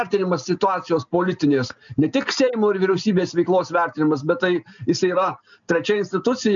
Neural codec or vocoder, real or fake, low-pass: none; real; 7.2 kHz